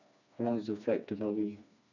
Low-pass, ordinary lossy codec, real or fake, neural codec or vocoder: 7.2 kHz; none; fake; codec, 16 kHz, 2 kbps, FreqCodec, smaller model